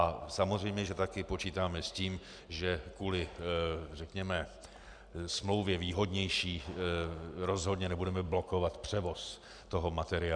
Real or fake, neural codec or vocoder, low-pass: real; none; 9.9 kHz